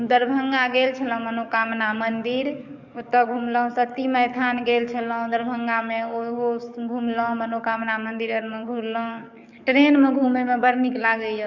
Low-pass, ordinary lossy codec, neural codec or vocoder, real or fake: 7.2 kHz; none; codec, 44.1 kHz, 7.8 kbps, DAC; fake